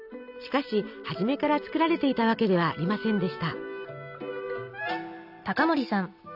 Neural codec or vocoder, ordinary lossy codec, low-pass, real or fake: none; none; 5.4 kHz; real